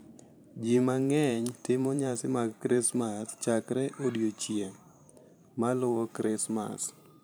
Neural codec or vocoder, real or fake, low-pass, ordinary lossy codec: vocoder, 44.1 kHz, 128 mel bands every 512 samples, BigVGAN v2; fake; none; none